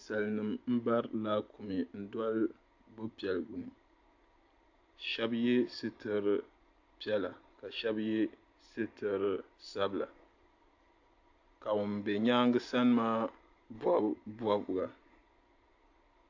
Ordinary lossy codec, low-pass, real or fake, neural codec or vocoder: AAC, 48 kbps; 7.2 kHz; real; none